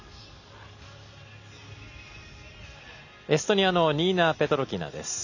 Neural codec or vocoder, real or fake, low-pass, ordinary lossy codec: none; real; 7.2 kHz; none